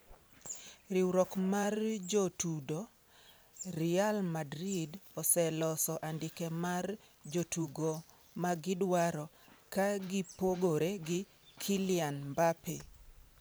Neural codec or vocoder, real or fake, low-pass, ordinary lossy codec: vocoder, 44.1 kHz, 128 mel bands every 512 samples, BigVGAN v2; fake; none; none